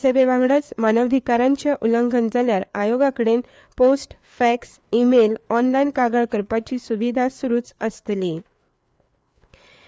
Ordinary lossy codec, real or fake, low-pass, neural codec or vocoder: none; fake; none; codec, 16 kHz, 4 kbps, FreqCodec, larger model